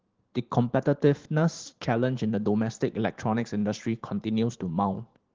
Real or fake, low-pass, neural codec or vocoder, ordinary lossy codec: fake; 7.2 kHz; vocoder, 44.1 kHz, 128 mel bands every 512 samples, BigVGAN v2; Opus, 16 kbps